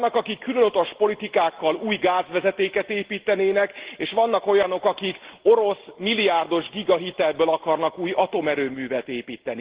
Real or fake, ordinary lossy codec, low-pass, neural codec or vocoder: real; Opus, 16 kbps; 3.6 kHz; none